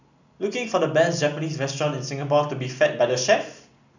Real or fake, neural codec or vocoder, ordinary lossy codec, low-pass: real; none; none; 7.2 kHz